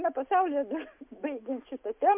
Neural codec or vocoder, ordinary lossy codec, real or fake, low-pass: none; MP3, 32 kbps; real; 3.6 kHz